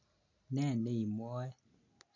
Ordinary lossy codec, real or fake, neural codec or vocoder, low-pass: none; real; none; 7.2 kHz